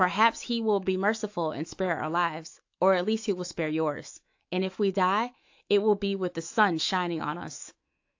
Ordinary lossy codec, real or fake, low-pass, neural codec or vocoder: AAC, 48 kbps; fake; 7.2 kHz; autoencoder, 48 kHz, 128 numbers a frame, DAC-VAE, trained on Japanese speech